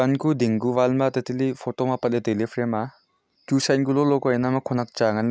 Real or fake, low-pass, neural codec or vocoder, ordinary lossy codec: real; none; none; none